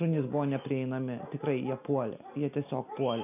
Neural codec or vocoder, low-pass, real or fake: none; 3.6 kHz; real